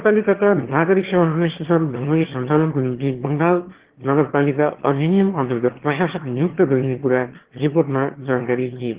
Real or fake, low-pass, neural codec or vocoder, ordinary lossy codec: fake; 3.6 kHz; autoencoder, 22.05 kHz, a latent of 192 numbers a frame, VITS, trained on one speaker; Opus, 16 kbps